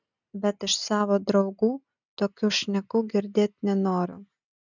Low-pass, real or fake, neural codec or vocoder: 7.2 kHz; real; none